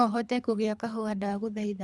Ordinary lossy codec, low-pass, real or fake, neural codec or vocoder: none; none; fake; codec, 24 kHz, 3 kbps, HILCodec